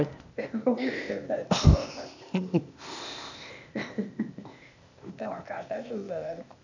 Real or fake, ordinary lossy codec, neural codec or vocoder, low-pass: fake; none; codec, 16 kHz, 0.8 kbps, ZipCodec; 7.2 kHz